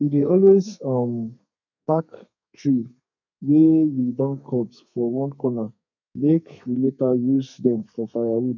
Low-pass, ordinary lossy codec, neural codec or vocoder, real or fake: 7.2 kHz; none; codec, 32 kHz, 1.9 kbps, SNAC; fake